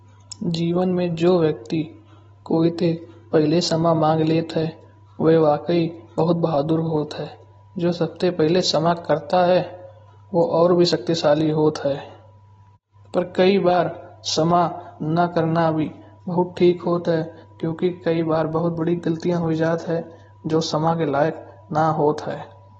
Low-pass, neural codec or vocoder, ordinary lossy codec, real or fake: 19.8 kHz; none; AAC, 24 kbps; real